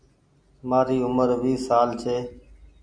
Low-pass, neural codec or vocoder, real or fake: 9.9 kHz; none; real